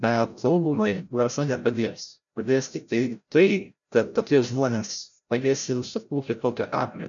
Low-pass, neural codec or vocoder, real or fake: 7.2 kHz; codec, 16 kHz, 0.5 kbps, FreqCodec, larger model; fake